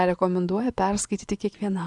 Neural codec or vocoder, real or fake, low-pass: vocoder, 24 kHz, 100 mel bands, Vocos; fake; 10.8 kHz